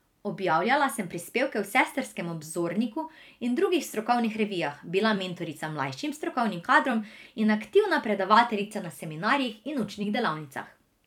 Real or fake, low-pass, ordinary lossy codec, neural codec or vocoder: fake; 19.8 kHz; none; vocoder, 44.1 kHz, 128 mel bands every 256 samples, BigVGAN v2